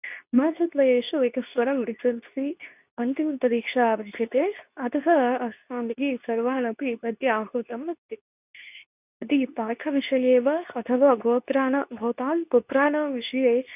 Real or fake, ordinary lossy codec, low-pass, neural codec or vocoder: fake; none; 3.6 kHz; codec, 24 kHz, 0.9 kbps, WavTokenizer, medium speech release version 2